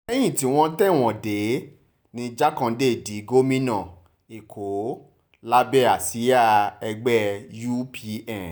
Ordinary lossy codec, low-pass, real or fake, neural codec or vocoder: none; none; real; none